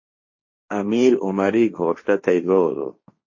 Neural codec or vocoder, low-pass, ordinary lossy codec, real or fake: codec, 16 kHz, 1.1 kbps, Voila-Tokenizer; 7.2 kHz; MP3, 32 kbps; fake